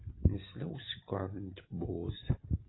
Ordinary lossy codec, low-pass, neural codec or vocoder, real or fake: AAC, 16 kbps; 7.2 kHz; none; real